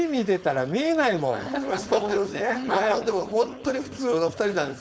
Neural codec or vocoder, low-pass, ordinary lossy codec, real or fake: codec, 16 kHz, 4.8 kbps, FACodec; none; none; fake